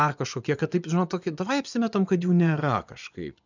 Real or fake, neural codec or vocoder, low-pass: fake; vocoder, 24 kHz, 100 mel bands, Vocos; 7.2 kHz